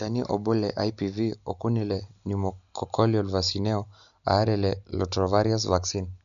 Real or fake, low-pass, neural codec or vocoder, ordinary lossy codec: real; 7.2 kHz; none; none